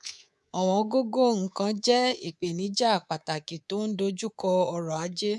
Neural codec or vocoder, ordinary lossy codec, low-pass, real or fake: codec, 24 kHz, 3.1 kbps, DualCodec; none; none; fake